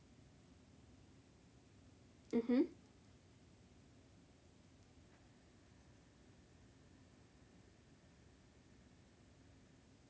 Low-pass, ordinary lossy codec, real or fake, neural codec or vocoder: none; none; real; none